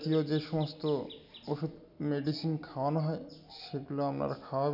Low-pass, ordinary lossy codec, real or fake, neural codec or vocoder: 5.4 kHz; none; real; none